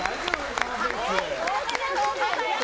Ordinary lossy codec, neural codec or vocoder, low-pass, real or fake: none; none; none; real